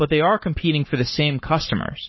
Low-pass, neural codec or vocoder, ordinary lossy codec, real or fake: 7.2 kHz; codec, 44.1 kHz, 7.8 kbps, Pupu-Codec; MP3, 24 kbps; fake